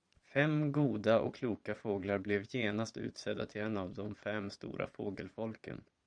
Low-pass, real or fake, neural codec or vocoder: 9.9 kHz; fake; vocoder, 22.05 kHz, 80 mel bands, Vocos